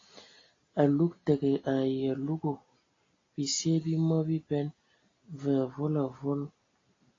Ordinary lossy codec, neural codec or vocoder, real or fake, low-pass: AAC, 32 kbps; none; real; 7.2 kHz